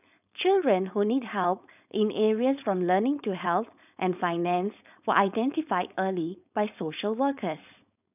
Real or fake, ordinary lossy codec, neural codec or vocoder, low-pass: fake; none; codec, 16 kHz, 4.8 kbps, FACodec; 3.6 kHz